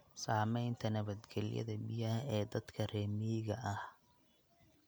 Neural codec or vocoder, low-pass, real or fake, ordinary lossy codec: none; none; real; none